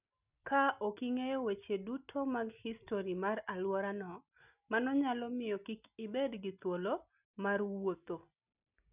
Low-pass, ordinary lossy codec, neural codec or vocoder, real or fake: 3.6 kHz; none; none; real